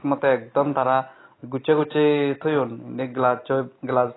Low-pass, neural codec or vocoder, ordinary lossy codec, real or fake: 7.2 kHz; none; AAC, 16 kbps; real